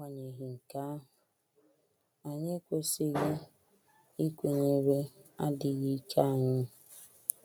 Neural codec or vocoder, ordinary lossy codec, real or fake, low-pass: none; none; real; none